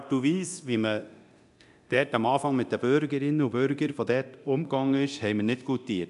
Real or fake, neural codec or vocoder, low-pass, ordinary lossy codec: fake; codec, 24 kHz, 0.9 kbps, DualCodec; 10.8 kHz; AAC, 96 kbps